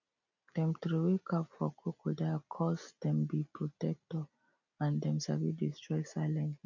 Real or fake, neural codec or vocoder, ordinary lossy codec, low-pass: real; none; none; 7.2 kHz